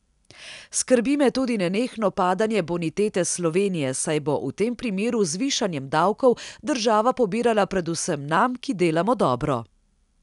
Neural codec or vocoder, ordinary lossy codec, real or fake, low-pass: none; none; real; 10.8 kHz